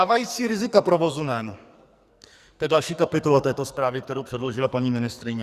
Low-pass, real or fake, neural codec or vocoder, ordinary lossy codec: 14.4 kHz; fake; codec, 44.1 kHz, 2.6 kbps, SNAC; Opus, 64 kbps